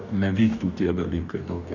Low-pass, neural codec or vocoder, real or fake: 7.2 kHz; codec, 44.1 kHz, 2.6 kbps, DAC; fake